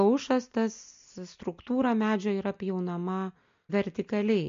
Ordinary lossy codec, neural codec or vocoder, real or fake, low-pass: MP3, 48 kbps; none; real; 7.2 kHz